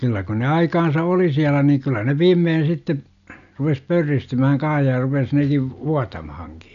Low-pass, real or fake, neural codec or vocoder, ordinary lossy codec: 7.2 kHz; real; none; none